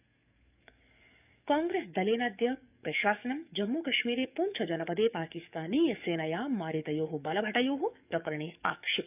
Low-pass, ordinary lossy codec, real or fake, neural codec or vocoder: 3.6 kHz; none; fake; codec, 44.1 kHz, 7.8 kbps, DAC